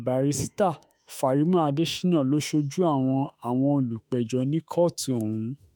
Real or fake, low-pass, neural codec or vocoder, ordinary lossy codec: fake; none; autoencoder, 48 kHz, 32 numbers a frame, DAC-VAE, trained on Japanese speech; none